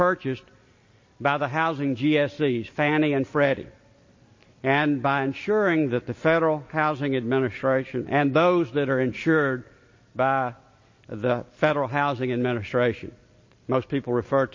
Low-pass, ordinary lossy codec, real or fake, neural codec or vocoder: 7.2 kHz; MP3, 32 kbps; real; none